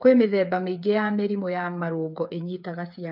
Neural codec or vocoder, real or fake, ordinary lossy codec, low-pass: codec, 16 kHz, 8 kbps, FreqCodec, smaller model; fake; none; 5.4 kHz